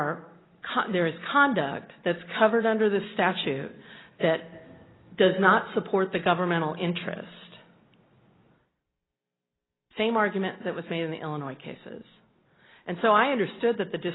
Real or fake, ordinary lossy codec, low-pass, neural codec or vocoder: real; AAC, 16 kbps; 7.2 kHz; none